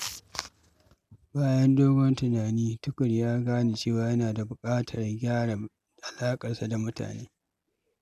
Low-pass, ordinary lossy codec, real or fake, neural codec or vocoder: 14.4 kHz; none; real; none